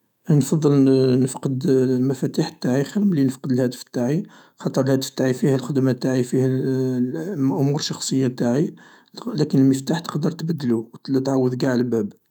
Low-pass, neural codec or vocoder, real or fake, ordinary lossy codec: 19.8 kHz; autoencoder, 48 kHz, 128 numbers a frame, DAC-VAE, trained on Japanese speech; fake; none